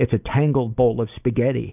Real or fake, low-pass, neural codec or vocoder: real; 3.6 kHz; none